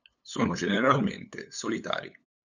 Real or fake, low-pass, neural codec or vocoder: fake; 7.2 kHz; codec, 16 kHz, 8 kbps, FunCodec, trained on LibriTTS, 25 frames a second